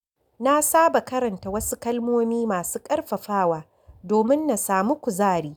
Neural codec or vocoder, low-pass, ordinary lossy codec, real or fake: none; none; none; real